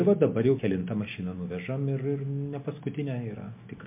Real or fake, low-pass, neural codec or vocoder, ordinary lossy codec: real; 3.6 kHz; none; MP3, 32 kbps